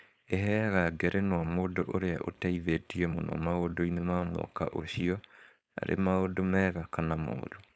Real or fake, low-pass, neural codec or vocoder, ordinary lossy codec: fake; none; codec, 16 kHz, 4.8 kbps, FACodec; none